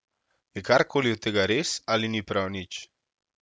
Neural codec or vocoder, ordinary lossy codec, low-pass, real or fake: none; none; none; real